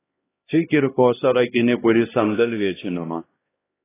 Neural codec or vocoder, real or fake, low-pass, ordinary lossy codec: codec, 16 kHz, 1 kbps, X-Codec, HuBERT features, trained on LibriSpeech; fake; 3.6 kHz; AAC, 16 kbps